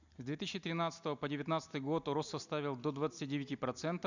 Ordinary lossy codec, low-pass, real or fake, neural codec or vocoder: none; 7.2 kHz; real; none